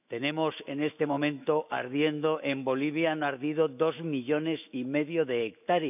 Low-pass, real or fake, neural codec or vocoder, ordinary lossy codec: 3.6 kHz; fake; autoencoder, 48 kHz, 128 numbers a frame, DAC-VAE, trained on Japanese speech; none